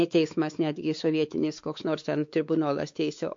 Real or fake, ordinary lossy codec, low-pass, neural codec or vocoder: fake; MP3, 48 kbps; 7.2 kHz; codec, 16 kHz, 4 kbps, X-Codec, WavLM features, trained on Multilingual LibriSpeech